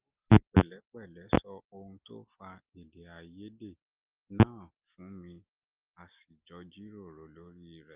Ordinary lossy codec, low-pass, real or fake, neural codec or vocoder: Opus, 32 kbps; 3.6 kHz; real; none